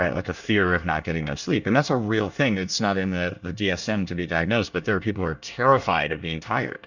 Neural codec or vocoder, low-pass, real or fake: codec, 24 kHz, 1 kbps, SNAC; 7.2 kHz; fake